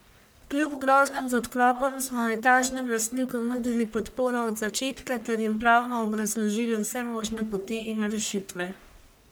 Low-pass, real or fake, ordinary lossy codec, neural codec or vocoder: none; fake; none; codec, 44.1 kHz, 1.7 kbps, Pupu-Codec